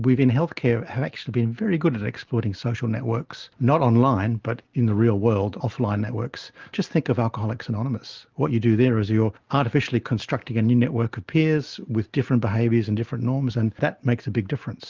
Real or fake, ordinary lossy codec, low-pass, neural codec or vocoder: real; Opus, 24 kbps; 7.2 kHz; none